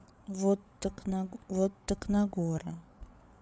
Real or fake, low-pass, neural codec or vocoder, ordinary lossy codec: fake; none; codec, 16 kHz, 8 kbps, FreqCodec, larger model; none